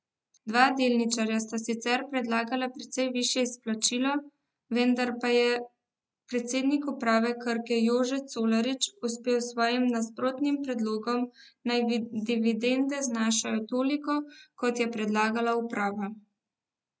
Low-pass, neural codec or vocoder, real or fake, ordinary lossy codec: none; none; real; none